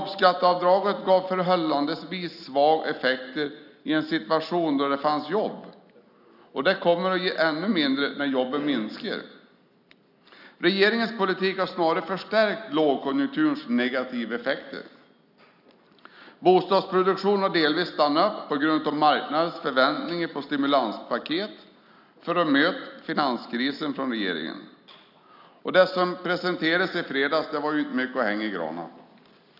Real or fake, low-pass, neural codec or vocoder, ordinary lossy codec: real; 5.4 kHz; none; none